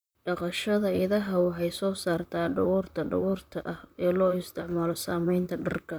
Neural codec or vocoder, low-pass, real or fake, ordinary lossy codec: vocoder, 44.1 kHz, 128 mel bands, Pupu-Vocoder; none; fake; none